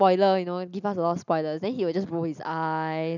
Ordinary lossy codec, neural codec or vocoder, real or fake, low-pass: none; none; real; 7.2 kHz